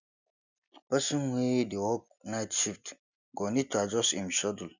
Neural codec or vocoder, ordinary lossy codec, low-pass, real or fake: none; none; 7.2 kHz; real